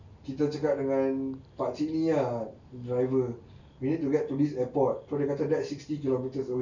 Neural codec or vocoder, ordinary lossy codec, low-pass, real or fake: none; none; 7.2 kHz; real